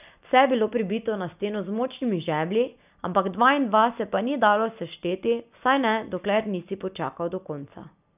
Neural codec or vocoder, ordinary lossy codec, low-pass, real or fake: none; none; 3.6 kHz; real